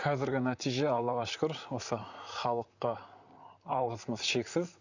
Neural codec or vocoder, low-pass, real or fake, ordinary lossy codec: vocoder, 44.1 kHz, 128 mel bands, Pupu-Vocoder; 7.2 kHz; fake; none